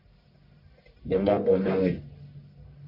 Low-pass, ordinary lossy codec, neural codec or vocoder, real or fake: 5.4 kHz; AAC, 48 kbps; codec, 44.1 kHz, 1.7 kbps, Pupu-Codec; fake